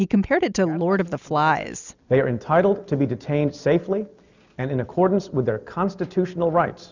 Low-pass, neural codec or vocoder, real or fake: 7.2 kHz; none; real